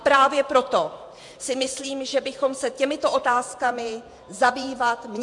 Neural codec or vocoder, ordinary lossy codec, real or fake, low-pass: vocoder, 48 kHz, 128 mel bands, Vocos; MP3, 64 kbps; fake; 10.8 kHz